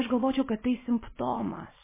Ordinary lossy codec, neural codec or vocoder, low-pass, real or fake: MP3, 16 kbps; none; 3.6 kHz; real